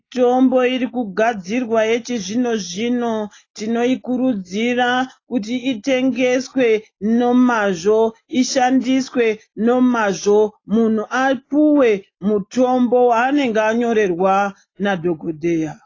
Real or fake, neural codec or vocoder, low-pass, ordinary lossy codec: real; none; 7.2 kHz; AAC, 32 kbps